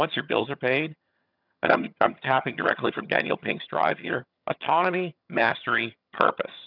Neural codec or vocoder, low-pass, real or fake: vocoder, 22.05 kHz, 80 mel bands, HiFi-GAN; 5.4 kHz; fake